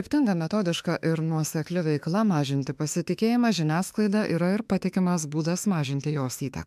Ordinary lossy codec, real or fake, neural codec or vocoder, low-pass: MP3, 96 kbps; fake; autoencoder, 48 kHz, 32 numbers a frame, DAC-VAE, trained on Japanese speech; 14.4 kHz